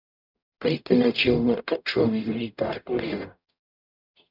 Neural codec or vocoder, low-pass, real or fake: codec, 44.1 kHz, 0.9 kbps, DAC; 5.4 kHz; fake